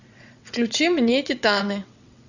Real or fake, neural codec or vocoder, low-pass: fake; vocoder, 22.05 kHz, 80 mel bands, WaveNeXt; 7.2 kHz